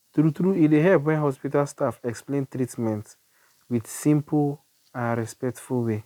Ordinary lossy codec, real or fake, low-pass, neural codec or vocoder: none; real; 19.8 kHz; none